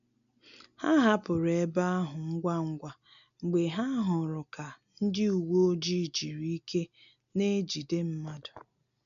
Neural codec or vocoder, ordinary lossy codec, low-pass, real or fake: none; none; 7.2 kHz; real